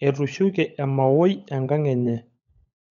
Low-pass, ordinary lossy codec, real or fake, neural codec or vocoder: 7.2 kHz; none; fake; codec, 16 kHz, 16 kbps, FunCodec, trained on LibriTTS, 50 frames a second